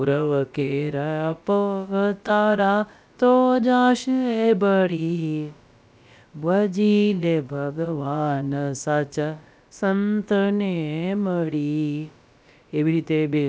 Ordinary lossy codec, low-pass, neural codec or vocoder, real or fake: none; none; codec, 16 kHz, about 1 kbps, DyCAST, with the encoder's durations; fake